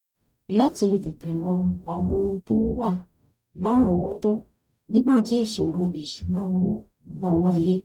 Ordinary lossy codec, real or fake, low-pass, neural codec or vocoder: none; fake; 19.8 kHz; codec, 44.1 kHz, 0.9 kbps, DAC